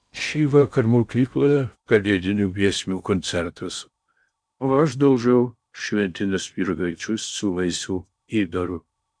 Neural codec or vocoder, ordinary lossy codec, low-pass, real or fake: codec, 16 kHz in and 24 kHz out, 0.6 kbps, FocalCodec, streaming, 2048 codes; MP3, 96 kbps; 9.9 kHz; fake